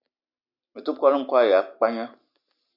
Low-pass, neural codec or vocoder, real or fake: 5.4 kHz; none; real